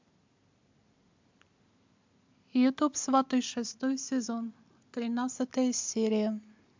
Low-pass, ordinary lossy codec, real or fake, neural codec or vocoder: 7.2 kHz; MP3, 64 kbps; real; none